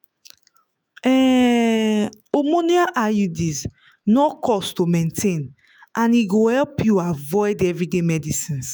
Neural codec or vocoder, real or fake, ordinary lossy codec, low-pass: autoencoder, 48 kHz, 128 numbers a frame, DAC-VAE, trained on Japanese speech; fake; none; none